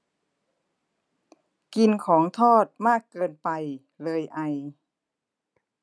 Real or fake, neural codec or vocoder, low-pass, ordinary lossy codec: real; none; none; none